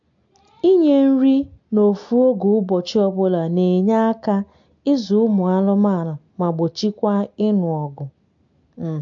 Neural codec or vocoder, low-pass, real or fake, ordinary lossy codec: none; 7.2 kHz; real; MP3, 48 kbps